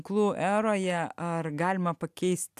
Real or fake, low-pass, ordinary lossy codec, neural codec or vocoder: real; 14.4 kHz; AAC, 96 kbps; none